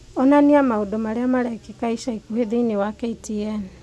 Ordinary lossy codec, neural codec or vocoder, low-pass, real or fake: none; none; none; real